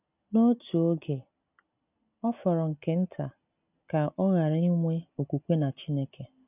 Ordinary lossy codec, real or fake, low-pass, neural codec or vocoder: none; real; 3.6 kHz; none